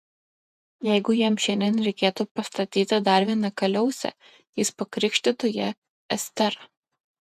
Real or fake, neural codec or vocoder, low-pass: fake; vocoder, 48 kHz, 128 mel bands, Vocos; 14.4 kHz